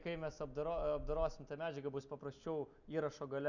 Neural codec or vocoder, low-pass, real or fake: none; 7.2 kHz; real